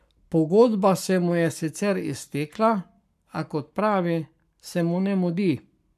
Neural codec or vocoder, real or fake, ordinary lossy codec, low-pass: codec, 44.1 kHz, 7.8 kbps, Pupu-Codec; fake; none; 14.4 kHz